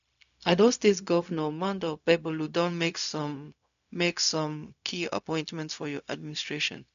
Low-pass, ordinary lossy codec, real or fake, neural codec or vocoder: 7.2 kHz; none; fake; codec, 16 kHz, 0.4 kbps, LongCat-Audio-Codec